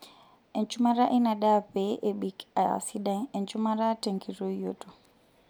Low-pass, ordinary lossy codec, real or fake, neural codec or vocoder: none; none; real; none